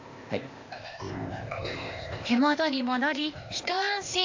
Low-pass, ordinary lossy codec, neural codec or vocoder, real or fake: 7.2 kHz; AAC, 48 kbps; codec, 16 kHz, 0.8 kbps, ZipCodec; fake